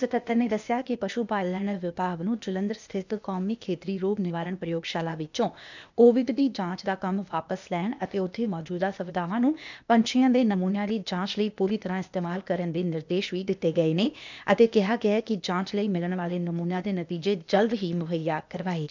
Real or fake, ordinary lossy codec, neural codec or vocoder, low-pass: fake; none; codec, 16 kHz, 0.8 kbps, ZipCodec; 7.2 kHz